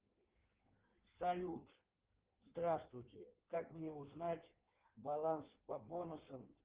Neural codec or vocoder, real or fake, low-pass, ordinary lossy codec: codec, 16 kHz in and 24 kHz out, 1.1 kbps, FireRedTTS-2 codec; fake; 3.6 kHz; Opus, 16 kbps